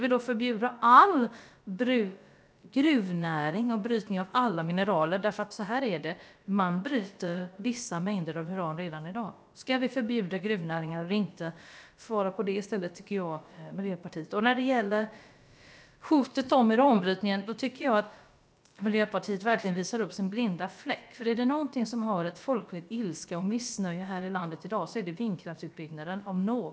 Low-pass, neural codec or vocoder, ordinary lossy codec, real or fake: none; codec, 16 kHz, about 1 kbps, DyCAST, with the encoder's durations; none; fake